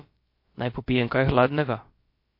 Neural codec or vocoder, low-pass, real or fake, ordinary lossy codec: codec, 16 kHz, about 1 kbps, DyCAST, with the encoder's durations; 5.4 kHz; fake; MP3, 32 kbps